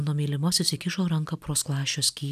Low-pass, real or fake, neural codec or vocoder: 14.4 kHz; real; none